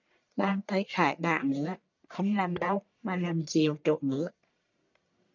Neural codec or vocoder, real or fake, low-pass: codec, 44.1 kHz, 1.7 kbps, Pupu-Codec; fake; 7.2 kHz